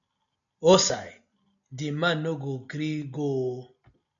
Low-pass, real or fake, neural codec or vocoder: 7.2 kHz; real; none